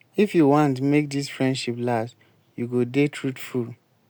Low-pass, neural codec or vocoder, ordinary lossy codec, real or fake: none; none; none; real